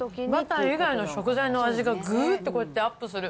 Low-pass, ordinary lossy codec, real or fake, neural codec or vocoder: none; none; real; none